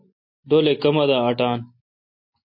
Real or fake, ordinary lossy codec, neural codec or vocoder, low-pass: real; MP3, 32 kbps; none; 5.4 kHz